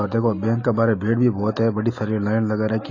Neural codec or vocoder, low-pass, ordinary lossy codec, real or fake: none; 7.2 kHz; AAC, 32 kbps; real